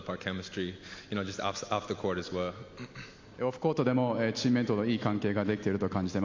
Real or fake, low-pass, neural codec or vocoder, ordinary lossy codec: real; 7.2 kHz; none; MP3, 48 kbps